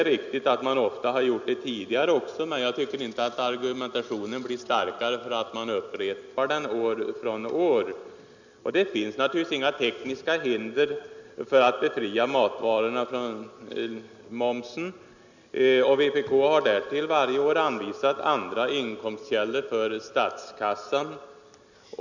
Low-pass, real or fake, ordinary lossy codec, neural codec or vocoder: 7.2 kHz; real; none; none